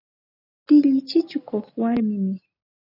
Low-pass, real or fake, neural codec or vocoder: 5.4 kHz; real; none